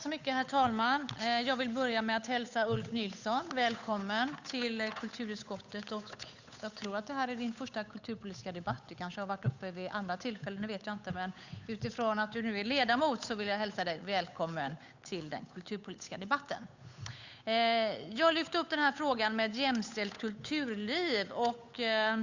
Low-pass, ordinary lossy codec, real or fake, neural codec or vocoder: 7.2 kHz; Opus, 64 kbps; fake; codec, 16 kHz, 8 kbps, FunCodec, trained on Chinese and English, 25 frames a second